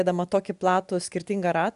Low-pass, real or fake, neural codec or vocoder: 10.8 kHz; real; none